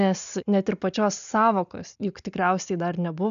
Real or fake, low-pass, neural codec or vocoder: real; 7.2 kHz; none